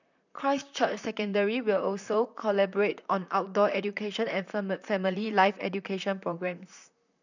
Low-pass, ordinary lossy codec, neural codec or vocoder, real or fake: 7.2 kHz; none; vocoder, 44.1 kHz, 128 mel bands, Pupu-Vocoder; fake